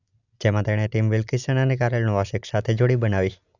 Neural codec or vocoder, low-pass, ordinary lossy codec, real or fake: none; 7.2 kHz; none; real